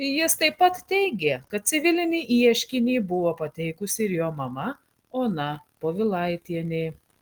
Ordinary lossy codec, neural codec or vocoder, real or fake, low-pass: Opus, 24 kbps; none; real; 19.8 kHz